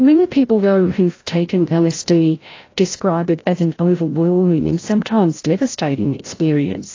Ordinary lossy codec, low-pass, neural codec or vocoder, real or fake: AAC, 32 kbps; 7.2 kHz; codec, 16 kHz, 0.5 kbps, FreqCodec, larger model; fake